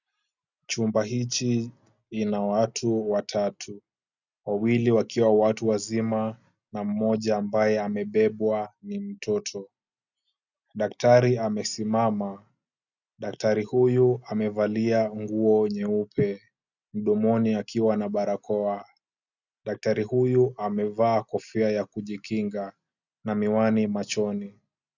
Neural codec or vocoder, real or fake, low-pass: none; real; 7.2 kHz